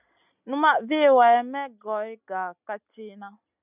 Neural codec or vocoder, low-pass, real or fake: none; 3.6 kHz; real